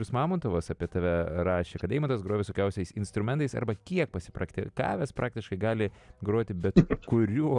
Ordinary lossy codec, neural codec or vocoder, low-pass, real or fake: MP3, 96 kbps; none; 10.8 kHz; real